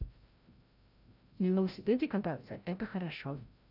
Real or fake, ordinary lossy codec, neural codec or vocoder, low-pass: fake; none; codec, 16 kHz, 0.5 kbps, FreqCodec, larger model; 5.4 kHz